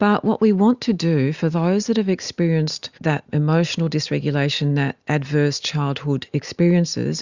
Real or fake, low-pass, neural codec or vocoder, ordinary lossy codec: real; 7.2 kHz; none; Opus, 64 kbps